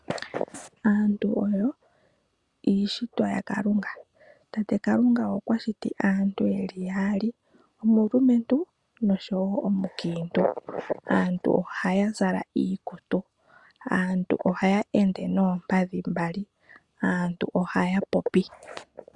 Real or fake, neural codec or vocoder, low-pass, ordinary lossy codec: real; none; 10.8 kHz; Opus, 64 kbps